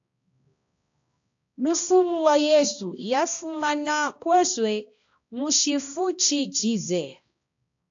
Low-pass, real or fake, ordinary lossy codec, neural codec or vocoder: 7.2 kHz; fake; AAC, 64 kbps; codec, 16 kHz, 1 kbps, X-Codec, HuBERT features, trained on balanced general audio